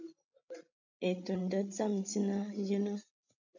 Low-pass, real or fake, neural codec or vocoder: 7.2 kHz; fake; codec, 16 kHz, 16 kbps, FreqCodec, larger model